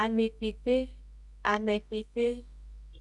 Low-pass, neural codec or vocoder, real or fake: 10.8 kHz; codec, 24 kHz, 0.9 kbps, WavTokenizer, medium music audio release; fake